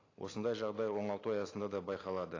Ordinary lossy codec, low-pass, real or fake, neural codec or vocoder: AAC, 32 kbps; 7.2 kHz; real; none